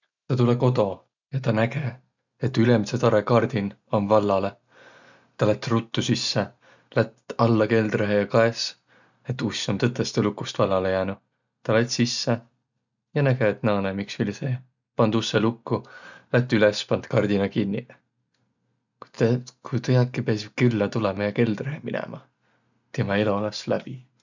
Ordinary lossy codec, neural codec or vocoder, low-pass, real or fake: none; none; 7.2 kHz; real